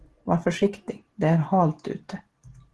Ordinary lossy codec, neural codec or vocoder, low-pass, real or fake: Opus, 16 kbps; none; 10.8 kHz; real